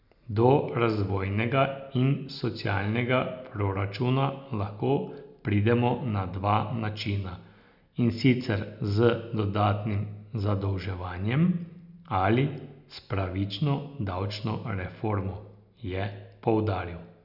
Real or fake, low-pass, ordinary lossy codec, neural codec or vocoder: real; 5.4 kHz; none; none